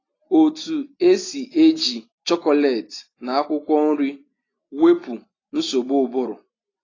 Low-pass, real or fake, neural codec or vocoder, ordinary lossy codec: 7.2 kHz; real; none; AAC, 32 kbps